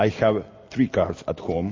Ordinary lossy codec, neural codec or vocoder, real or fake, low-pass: MP3, 32 kbps; none; real; 7.2 kHz